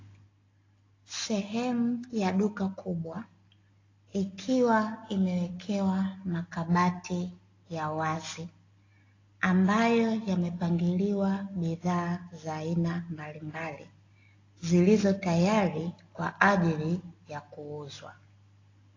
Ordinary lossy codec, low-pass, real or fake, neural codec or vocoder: AAC, 32 kbps; 7.2 kHz; real; none